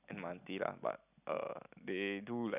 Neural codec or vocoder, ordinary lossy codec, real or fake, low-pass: none; none; real; 3.6 kHz